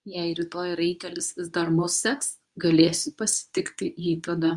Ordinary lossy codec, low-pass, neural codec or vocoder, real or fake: Opus, 64 kbps; 10.8 kHz; codec, 24 kHz, 0.9 kbps, WavTokenizer, medium speech release version 2; fake